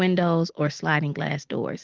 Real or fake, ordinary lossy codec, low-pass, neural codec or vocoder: real; Opus, 16 kbps; 7.2 kHz; none